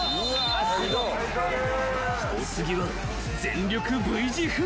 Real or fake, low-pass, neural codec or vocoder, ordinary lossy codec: real; none; none; none